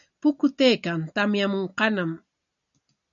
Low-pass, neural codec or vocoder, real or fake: 7.2 kHz; none; real